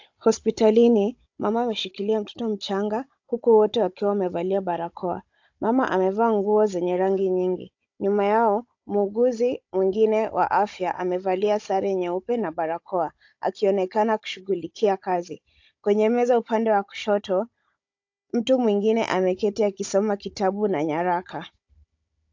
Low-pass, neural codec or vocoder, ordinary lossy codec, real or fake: 7.2 kHz; codec, 16 kHz, 16 kbps, FunCodec, trained on Chinese and English, 50 frames a second; AAC, 48 kbps; fake